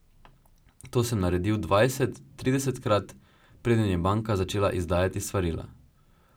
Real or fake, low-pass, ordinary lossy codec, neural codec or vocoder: real; none; none; none